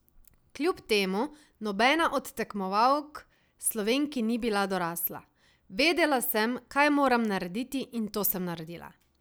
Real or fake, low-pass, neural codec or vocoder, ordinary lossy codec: real; none; none; none